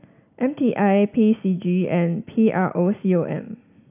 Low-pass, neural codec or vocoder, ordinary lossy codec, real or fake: 3.6 kHz; none; AAC, 32 kbps; real